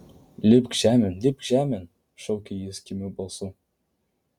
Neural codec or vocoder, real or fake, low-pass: none; real; 19.8 kHz